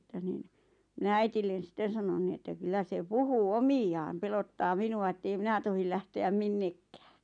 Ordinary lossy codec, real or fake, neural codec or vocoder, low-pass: none; real; none; 9.9 kHz